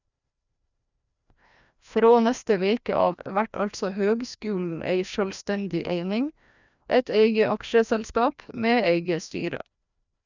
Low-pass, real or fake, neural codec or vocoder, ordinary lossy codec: 7.2 kHz; fake; codec, 16 kHz, 1 kbps, FreqCodec, larger model; none